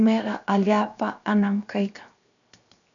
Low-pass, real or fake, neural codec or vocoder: 7.2 kHz; fake; codec, 16 kHz, 0.7 kbps, FocalCodec